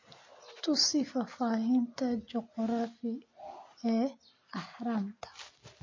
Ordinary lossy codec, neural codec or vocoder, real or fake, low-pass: MP3, 32 kbps; none; real; 7.2 kHz